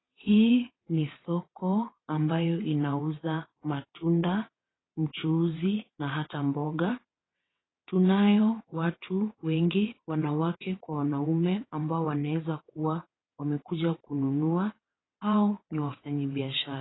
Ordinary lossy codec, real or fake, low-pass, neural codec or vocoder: AAC, 16 kbps; fake; 7.2 kHz; vocoder, 22.05 kHz, 80 mel bands, WaveNeXt